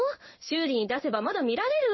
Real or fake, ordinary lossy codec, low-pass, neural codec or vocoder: fake; MP3, 24 kbps; 7.2 kHz; codec, 16 kHz in and 24 kHz out, 1 kbps, XY-Tokenizer